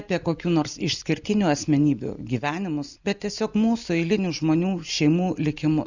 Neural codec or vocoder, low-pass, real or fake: none; 7.2 kHz; real